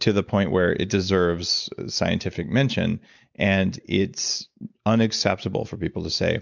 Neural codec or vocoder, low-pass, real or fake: none; 7.2 kHz; real